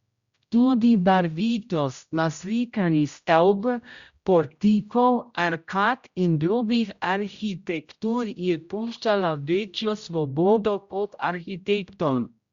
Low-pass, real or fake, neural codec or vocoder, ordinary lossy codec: 7.2 kHz; fake; codec, 16 kHz, 0.5 kbps, X-Codec, HuBERT features, trained on general audio; Opus, 64 kbps